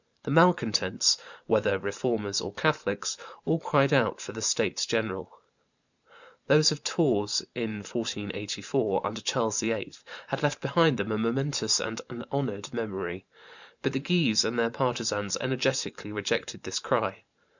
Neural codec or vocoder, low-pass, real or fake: vocoder, 44.1 kHz, 128 mel bands every 512 samples, BigVGAN v2; 7.2 kHz; fake